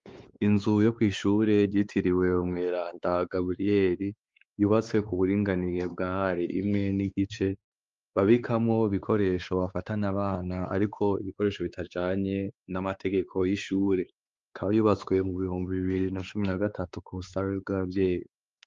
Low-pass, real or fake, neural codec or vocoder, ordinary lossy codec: 7.2 kHz; fake; codec, 16 kHz, 4 kbps, X-Codec, WavLM features, trained on Multilingual LibriSpeech; Opus, 32 kbps